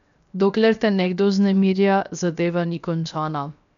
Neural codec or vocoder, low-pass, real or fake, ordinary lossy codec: codec, 16 kHz, 0.7 kbps, FocalCodec; 7.2 kHz; fake; MP3, 96 kbps